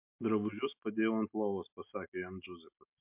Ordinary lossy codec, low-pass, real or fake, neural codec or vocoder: MP3, 32 kbps; 3.6 kHz; real; none